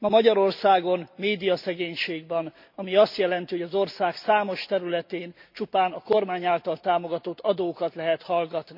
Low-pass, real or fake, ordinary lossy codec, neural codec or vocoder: 5.4 kHz; real; MP3, 48 kbps; none